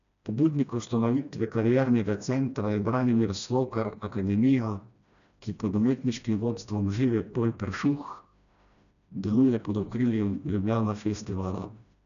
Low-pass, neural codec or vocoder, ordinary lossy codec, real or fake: 7.2 kHz; codec, 16 kHz, 1 kbps, FreqCodec, smaller model; none; fake